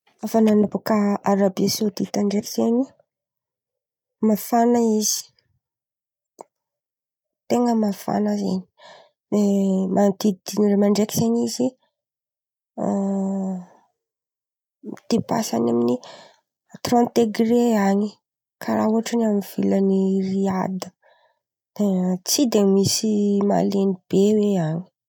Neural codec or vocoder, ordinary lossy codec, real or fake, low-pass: none; none; real; 19.8 kHz